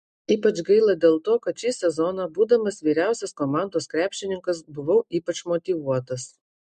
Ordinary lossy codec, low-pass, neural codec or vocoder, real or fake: MP3, 64 kbps; 9.9 kHz; none; real